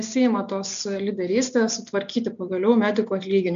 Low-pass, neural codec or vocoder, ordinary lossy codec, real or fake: 7.2 kHz; none; AAC, 64 kbps; real